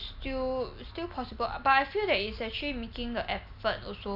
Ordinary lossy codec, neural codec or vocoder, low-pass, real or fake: MP3, 48 kbps; none; 5.4 kHz; real